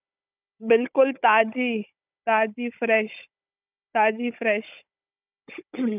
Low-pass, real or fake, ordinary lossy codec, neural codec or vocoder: 3.6 kHz; fake; none; codec, 16 kHz, 16 kbps, FunCodec, trained on Chinese and English, 50 frames a second